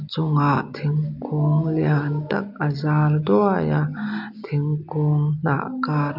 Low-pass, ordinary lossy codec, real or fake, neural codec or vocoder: 5.4 kHz; none; real; none